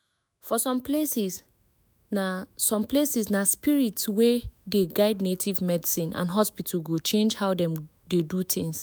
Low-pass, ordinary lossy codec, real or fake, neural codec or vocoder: none; none; fake; autoencoder, 48 kHz, 128 numbers a frame, DAC-VAE, trained on Japanese speech